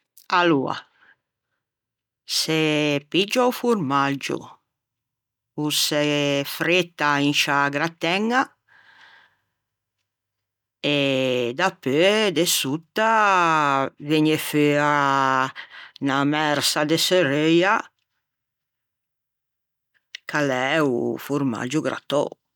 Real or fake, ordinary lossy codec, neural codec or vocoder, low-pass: real; none; none; 19.8 kHz